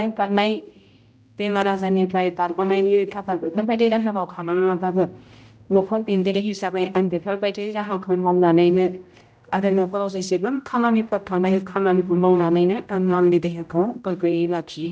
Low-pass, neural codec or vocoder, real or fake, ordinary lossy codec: none; codec, 16 kHz, 0.5 kbps, X-Codec, HuBERT features, trained on general audio; fake; none